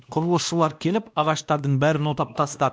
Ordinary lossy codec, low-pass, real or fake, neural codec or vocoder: none; none; fake; codec, 16 kHz, 1 kbps, X-Codec, WavLM features, trained on Multilingual LibriSpeech